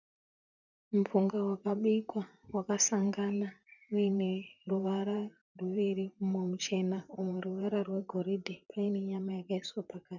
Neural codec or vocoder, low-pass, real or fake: vocoder, 44.1 kHz, 128 mel bands, Pupu-Vocoder; 7.2 kHz; fake